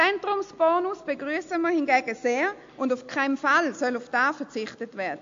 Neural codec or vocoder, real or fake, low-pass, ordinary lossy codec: none; real; 7.2 kHz; AAC, 48 kbps